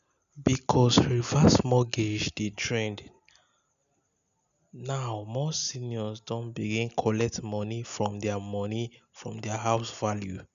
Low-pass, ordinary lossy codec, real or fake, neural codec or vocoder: 7.2 kHz; none; real; none